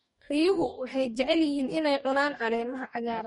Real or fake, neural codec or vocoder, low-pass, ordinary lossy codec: fake; codec, 44.1 kHz, 2.6 kbps, DAC; 19.8 kHz; MP3, 48 kbps